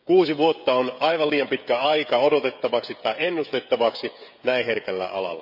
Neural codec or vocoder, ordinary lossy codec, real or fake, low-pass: codec, 16 kHz, 16 kbps, FreqCodec, smaller model; none; fake; 5.4 kHz